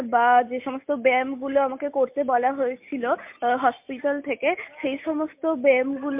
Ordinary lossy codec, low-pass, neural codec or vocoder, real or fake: MP3, 32 kbps; 3.6 kHz; none; real